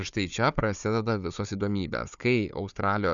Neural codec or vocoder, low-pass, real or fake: codec, 16 kHz, 4 kbps, FunCodec, trained on Chinese and English, 50 frames a second; 7.2 kHz; fake